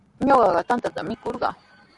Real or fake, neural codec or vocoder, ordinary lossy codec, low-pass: fake; vocoder, 44.1 kHz, 128 mel bands every 512 samples, BigVGAN v2; AAC, 64 kbps; 10.8 kHz